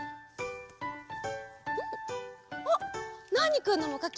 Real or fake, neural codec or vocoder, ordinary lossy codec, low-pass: real; none; none; none